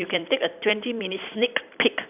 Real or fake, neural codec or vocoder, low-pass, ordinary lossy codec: fake; vocoder, 44.1 kHz, 128 mel bands every 256 samples, BigVGAN v2; 3.6 kHz; none